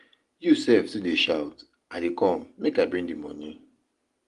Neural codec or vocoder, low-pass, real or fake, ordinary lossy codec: none; 10.8 kHz; real; Opus, 24 kbps